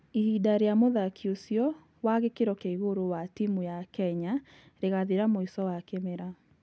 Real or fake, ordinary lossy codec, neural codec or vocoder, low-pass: real; none; none; none